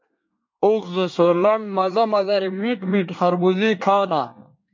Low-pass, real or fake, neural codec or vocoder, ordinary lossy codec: 7.2 kHz; fake; codec, 24 kHz, 1 kbps, SNAC; MP3, 48 kbps